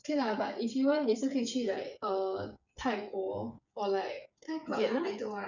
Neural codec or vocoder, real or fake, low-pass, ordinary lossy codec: codec, 16 kHz, 8 kbps, FreqCodec, smaller model; fake; 7.2 kHz; none